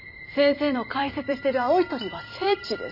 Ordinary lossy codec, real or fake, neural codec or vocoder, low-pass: none; fake; vocoder, 44.1 kHz, 128 mel bands every 256 samples, BigVGAN v2; 5.4 kHz